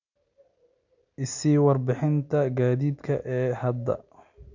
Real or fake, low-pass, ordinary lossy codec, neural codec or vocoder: real; 7.2 kHz; none; none